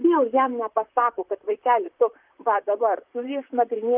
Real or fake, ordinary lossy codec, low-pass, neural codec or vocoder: fake; Opus, 24 kbps; 3.6 kHz; vocoder, 44.1 kHz, 128 mel bands, Pupu-Vocoder